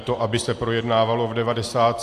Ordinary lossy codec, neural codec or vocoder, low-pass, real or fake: AAC, 64 kbps; none; 14.4 kHz; real